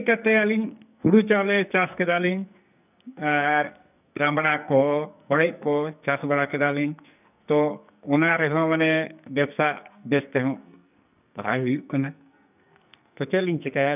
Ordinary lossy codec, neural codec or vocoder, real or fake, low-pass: none; codec, 44.1 kHz, 2.6 kbps, SNAC; fake; 3.6 kHz